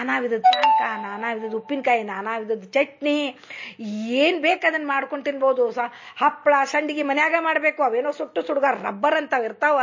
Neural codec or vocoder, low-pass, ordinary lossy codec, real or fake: none; 7.2 kHz; MP3, 32 kbps; real